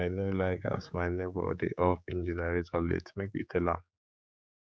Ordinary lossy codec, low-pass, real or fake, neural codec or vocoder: none; none; fake; codec, 16 kHz, 4 kbps, X-Codec, HuBERT features, trained on general audio